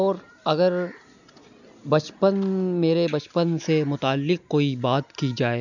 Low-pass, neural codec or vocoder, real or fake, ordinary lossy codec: 7.2 kHz; none; real; none